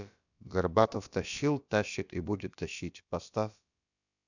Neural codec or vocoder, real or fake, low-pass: codec, 16 kHz, about 1 kbps, DyCAST, with the encoder's durations; fake; 7.2 kHz